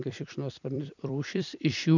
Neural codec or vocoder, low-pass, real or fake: vocoder, 44.1 kHz, 128 mel bands every 256 samples, BigVGAN v2; 7.2 kHz; fake